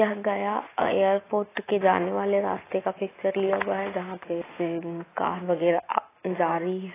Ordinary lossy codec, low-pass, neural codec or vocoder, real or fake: AAC, 16 kbps; 3.6 kHz; none; real